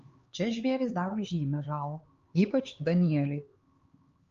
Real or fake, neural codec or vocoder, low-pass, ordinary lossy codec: fake; codec, 16 kHz, 4 kbps, X-Codec, HuBERT features, trained on LibriSpeech; 7.2 kHz; Opus, 32 kbps